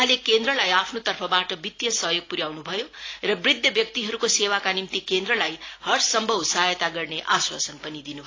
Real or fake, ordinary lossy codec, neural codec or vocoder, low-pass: real; AAC, 32 kbps; none; 7.2 kHz